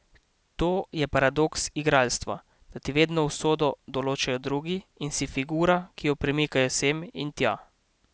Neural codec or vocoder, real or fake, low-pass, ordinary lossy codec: none; real; none; none